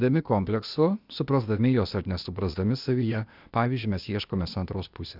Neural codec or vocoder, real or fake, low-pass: codec, 16 kHz, 0.8 kbps, ZipCodec; fake; 5.4 kHz